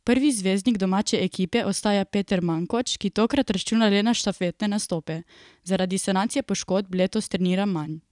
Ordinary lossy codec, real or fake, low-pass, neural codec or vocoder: none; fake; 10.8 kHz; vocoder, 44.1 kHz, 128 mel bands every 256 samples, BigVGAN v2